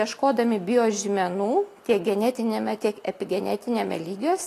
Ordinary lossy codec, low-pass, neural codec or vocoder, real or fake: AAC, 48 kbps; 14.4 kHz; none; real